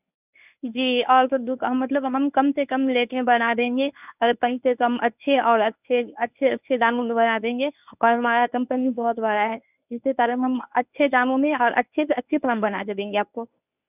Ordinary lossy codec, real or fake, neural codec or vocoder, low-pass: none; fake; codec, 24 kHz, 0.9 kbps, WavTokenizer, medium speech release version 1; 3.6 kHz